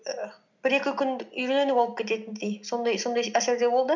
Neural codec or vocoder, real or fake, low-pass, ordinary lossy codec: none; real; 7.2 kHz; none